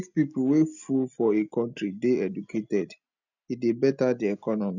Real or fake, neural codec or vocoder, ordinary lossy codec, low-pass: real; none; none; 7.2 kHz